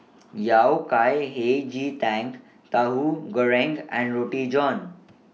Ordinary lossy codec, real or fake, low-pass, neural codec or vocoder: none; real; none; none